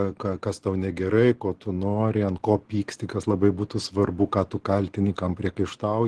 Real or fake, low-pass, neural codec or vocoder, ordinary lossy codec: real; 10.8 kHz; none; Opus, 16 kbps